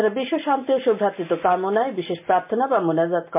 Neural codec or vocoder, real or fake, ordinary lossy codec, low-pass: none; real; MP3, 32 kbps; 3.6 kHz